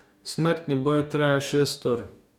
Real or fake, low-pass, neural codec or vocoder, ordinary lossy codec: fake; 19.8 kHz; codec, 44.1 kHz, 2.6 kbps, DAC; none